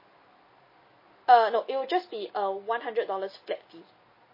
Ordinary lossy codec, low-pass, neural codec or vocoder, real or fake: MP3, 24 kbps; 5.4 kHz; none; real